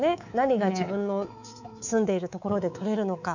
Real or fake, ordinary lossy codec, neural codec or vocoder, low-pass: fake; none; codec, 24 kHz, 3.1 kbps, DualCodec; 7.2 kHz